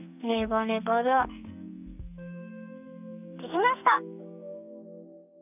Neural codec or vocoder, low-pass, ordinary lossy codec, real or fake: codec, 44.1 kHz, 2.6 kbps, SNAC; 3.6 kHz; none; fake